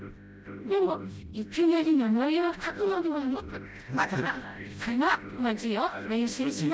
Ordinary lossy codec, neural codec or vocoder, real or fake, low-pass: none; codec, 16 kHz, 0.5 kbps, FreqCodec, smaller model; fake; none